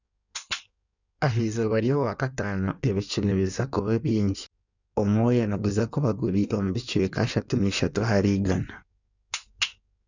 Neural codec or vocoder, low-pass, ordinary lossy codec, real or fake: codec, 16 kHz in and 24 kHz out, 1.1 kbps, FireRedTTS-2 codec; 7.2 kHz; none; fake